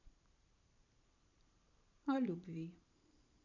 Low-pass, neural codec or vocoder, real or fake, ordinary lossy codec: 7.2 kHz; vocoder, 44.1 kHz, 128 mel bands every 512 samples, BigVGAN v2; fake; none